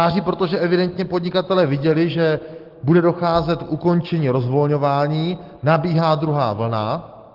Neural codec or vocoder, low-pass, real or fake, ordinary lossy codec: none; 5.4 kHz; real; Opus, 16 kbps